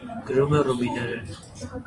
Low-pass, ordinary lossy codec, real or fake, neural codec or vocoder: 10.8 kHz; AAC, 48 kbps; fake; vocoder, 24 kHz, 100 mel bands, Vocos